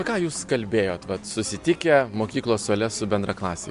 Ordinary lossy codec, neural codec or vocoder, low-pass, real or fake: MP3, 64 kbps; none; 10.8 kHz; real